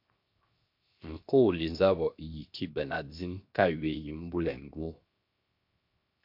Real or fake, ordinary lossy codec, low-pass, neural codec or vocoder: fake; MP3, 48 kbps; 5.4 kHz; codec, 16 kHz, 0.7 kbps, FocalCodec